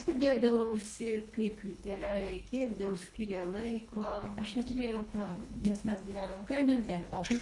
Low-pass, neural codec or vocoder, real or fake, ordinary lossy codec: 10.8 kHz; codec, 24 kHz, 1.5 kbps, HILCodec; fake; Opus, 64 kbps